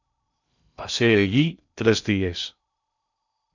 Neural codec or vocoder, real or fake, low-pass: codec, 16 kHz in and 24 kHz out, 0.6 kbps, FocalCodec, streaming, 2048 codes; fake; 7.2 kHz